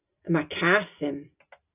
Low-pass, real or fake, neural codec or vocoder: 3.6 kHz; real; none